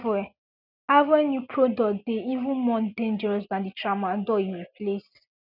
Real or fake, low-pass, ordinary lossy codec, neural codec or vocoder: real; 5.4 kHz; none; none